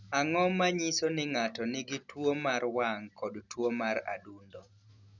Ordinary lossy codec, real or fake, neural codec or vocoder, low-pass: none; real; none; 7.2 kHz